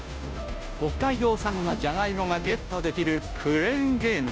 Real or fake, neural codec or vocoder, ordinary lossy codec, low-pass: fake; codec, 16 kHz, 0.5 kbps, FunCodec, trained on Chinese and English, 25 frames a second; none; none